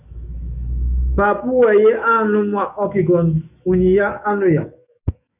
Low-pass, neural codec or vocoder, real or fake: 3.6 kHz; autoencoder, 48 kHz, 128 numbers a frame, DAC-VAE, trained on Japanese speech; fake